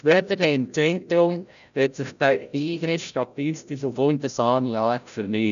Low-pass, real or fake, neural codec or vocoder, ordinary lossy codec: 7.2 kHz; fake; codec, 16 kHz, 0.5 kbps, FreqCodec, larger model; none